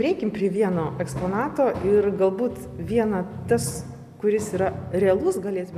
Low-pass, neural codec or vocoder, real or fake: 14.4 kHz; none; real